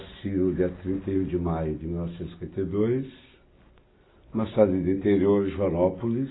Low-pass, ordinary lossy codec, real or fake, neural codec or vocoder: 7.2 kHz; AAC, 16 kbps; real; none